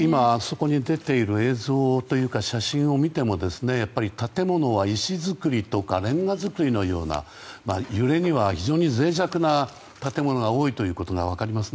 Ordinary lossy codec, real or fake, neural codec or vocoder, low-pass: none; real; none; none